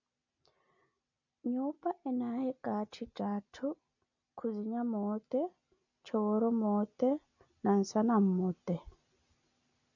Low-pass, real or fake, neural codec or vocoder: 7.2 kHz; real; none